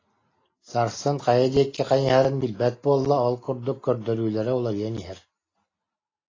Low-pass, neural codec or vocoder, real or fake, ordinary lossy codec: 7.2 kHz; none; real; AAC, 32 kbps